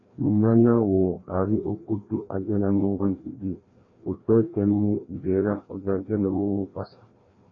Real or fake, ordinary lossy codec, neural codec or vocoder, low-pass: fake; AAC, 32 kbps; codec, 16 kHz, 1 kbps, FreqCodec, larger model; 7.2 kHz